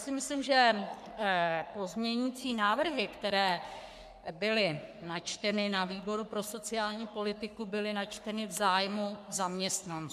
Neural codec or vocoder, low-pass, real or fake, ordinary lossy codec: codec, 44.1 kHz, 3.4 kbps, Pupu-Codec; 14.4 kHz; fake; MP3, 96 kbps